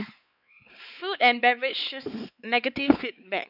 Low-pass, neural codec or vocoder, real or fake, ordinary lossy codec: 5.4 kHz; codec, 16 kHz, 4 kbps, X-Codec, WavLM features, trained on Multilingual LibriSpeech; fake; none